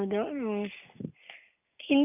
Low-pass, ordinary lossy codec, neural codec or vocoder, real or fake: 3.6 kHz; none; none; real